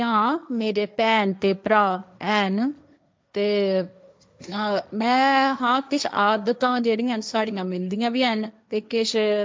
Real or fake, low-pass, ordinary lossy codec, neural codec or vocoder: fake; none; none; codec, 16 kHz, 1.1 kbps, Voila-Tokenizer